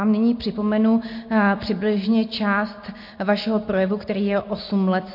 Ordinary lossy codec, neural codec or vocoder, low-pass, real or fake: MP3, 32 kbps; none; 5.4 kHz; real